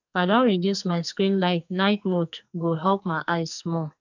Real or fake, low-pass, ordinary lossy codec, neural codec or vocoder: fake; 7.2 kHz; none; codec, 44.1 kHz, 2.6 kbps, SNAC